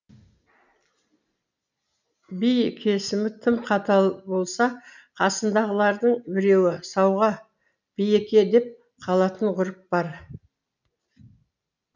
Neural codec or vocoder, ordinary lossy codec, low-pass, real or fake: none; none; 7.2 kHz; real